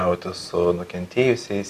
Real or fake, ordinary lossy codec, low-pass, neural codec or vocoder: real; Opus, 64 kbps; 14.4 kHz; none